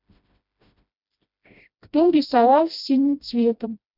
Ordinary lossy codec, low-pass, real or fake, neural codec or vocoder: none; 5.4 kHz; fake; codec, 16 kHz, 1 kbps, FreqCodec, smaller model